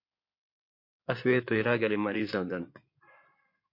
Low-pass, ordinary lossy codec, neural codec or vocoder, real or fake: 5.4 kHz; MP3, 32 kbps; codec, 16 kHz in and 24 kHz out, 2.2 kbps, FireRedTTS-2 codec; fake